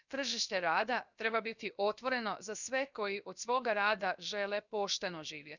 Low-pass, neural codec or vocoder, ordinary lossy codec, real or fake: 7.2 kHz; codec, 16 kHz, 0.7 kbps, FocalCodec; none; fake